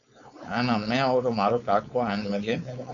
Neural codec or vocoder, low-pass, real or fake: codec, 16 kHz, 4.8 kbps, FACodec; 7.2 kHz; fake